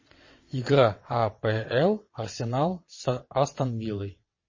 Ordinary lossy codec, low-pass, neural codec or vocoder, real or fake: MP3, 32 kbps; 7.2 kHz; vocoder, 22.05 kHz, 80 mel bands, WaveNeXt; fake